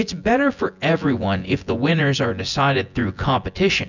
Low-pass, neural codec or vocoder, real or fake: 7.2 kHz; vocoder, 24 kHz, 100 mel bands, Vocos; fake